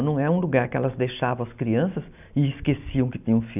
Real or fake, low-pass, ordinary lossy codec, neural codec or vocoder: real; 3.6 kHz; none; none